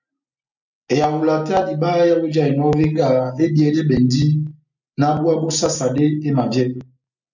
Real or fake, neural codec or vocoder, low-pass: real; none; 7.2 kHz